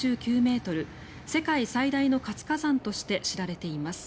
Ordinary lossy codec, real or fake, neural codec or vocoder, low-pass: none; real; none; none